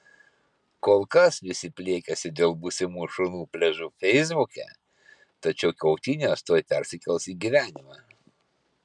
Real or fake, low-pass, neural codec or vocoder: real; 10.8 kHz; none